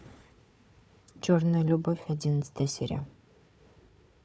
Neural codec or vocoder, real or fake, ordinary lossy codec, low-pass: codec, 16 kHz, 4 kbps, FunCodec, trained on Chinese and English, 50 frames a second; fake; none; none